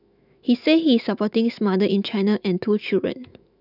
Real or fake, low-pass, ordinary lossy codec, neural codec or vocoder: fake; 5.4 kHz; none; autoencoder, 48 kHz, 128 numbers a frame, DAC-VAE, trained on Japanese speech